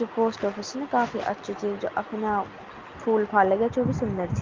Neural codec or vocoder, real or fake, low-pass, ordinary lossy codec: none; real; 7.2 kHz; Opus, 24 kbps